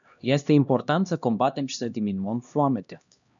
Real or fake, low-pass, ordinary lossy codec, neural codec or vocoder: fake; 7.2 kHz; MP3, 96 kbps; codec, 16 kHz, 1 kbps, X-Codec, HuBERT features, trained on LibriSpeech